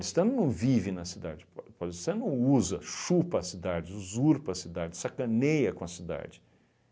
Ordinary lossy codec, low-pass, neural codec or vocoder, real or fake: none; none; none; real